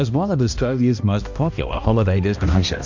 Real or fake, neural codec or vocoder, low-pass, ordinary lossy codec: fake; codec, 16 kHz, 1 kbps, X-Codec, HuBERT features, trained on balanced general audio; 7.2 kHz; MP3, 48 kbps